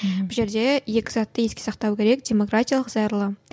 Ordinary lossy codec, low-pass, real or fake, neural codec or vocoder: none; none; real; none